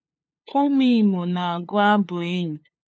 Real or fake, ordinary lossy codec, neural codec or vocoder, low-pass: fake; none; codec, 16 kHz, 2 kbps, FunCodec, trained on LibriTTS, 25 frames a second; none